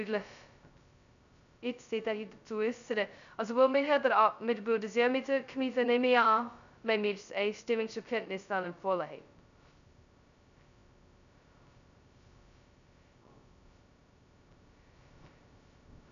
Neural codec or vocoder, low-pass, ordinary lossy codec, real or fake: codec, 16 kHz, 0.2 kbps, FocalCodec; 7.2 kHz; none; fake